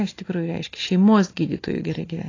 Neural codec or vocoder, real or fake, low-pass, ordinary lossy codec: none; real; 7.2 kHz; AAC, 32 kbps